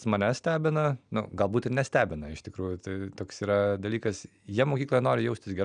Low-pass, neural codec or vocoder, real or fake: 9.9 kHz; none; real